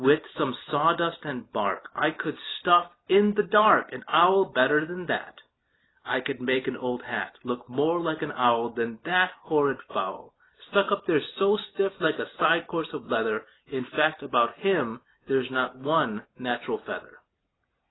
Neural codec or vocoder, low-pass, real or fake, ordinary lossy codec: none; 7.2 kHz; real; AAC, 16 kbps